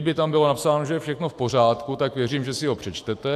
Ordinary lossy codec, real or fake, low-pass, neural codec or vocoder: AAC, 64 kbps; fake; 14.4 kHz; autoencoder, 48 kHz, 128 numbers a frame, DAC-VAE, trained on Japanese speech